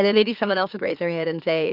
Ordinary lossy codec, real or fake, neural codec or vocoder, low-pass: Opus, 24 kbps; fake; autoencoder, 44.1 kHz, a latent of 192 numbers a frame, MeloTTS; 5.4 kHz